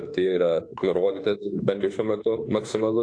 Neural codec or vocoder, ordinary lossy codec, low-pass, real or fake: autoencoder, 48 kHz, 32 numbers a frame, DAC-VAE, trained on Japanese speech; AAC, 48 kbps; 9.9 kHz; fake